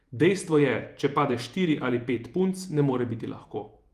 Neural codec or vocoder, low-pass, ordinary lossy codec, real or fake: vocoder, 48 kHz, 128 mel bands, Vocos; 14.4 kHz; Opus, 32 kbps; fake